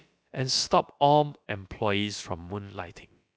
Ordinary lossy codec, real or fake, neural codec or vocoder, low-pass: none; fake; codec, 16 kHz, about 1 kbps, DyCAST, with the encoder's durations; none